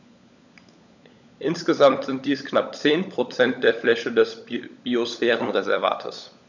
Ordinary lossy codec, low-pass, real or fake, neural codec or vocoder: none; 7.2 kHz; fake; codec, 16 kHz, 16 kbps, FunCodec, trained on LibriTTS, 50 frames a second